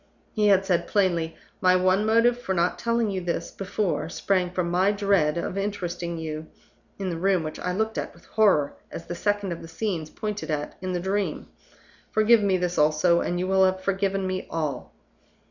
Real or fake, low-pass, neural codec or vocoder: real; 7.2 kHz; none